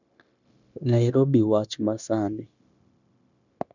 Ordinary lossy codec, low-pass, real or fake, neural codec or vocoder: none; 7.2 kHz; fake; codec, 16 kHz, 0.9 kbps, LongCat-Audio-Codec